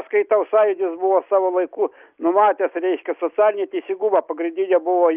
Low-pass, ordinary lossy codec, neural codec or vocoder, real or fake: 3.6 kHz; Opus, 24 kbps; none; real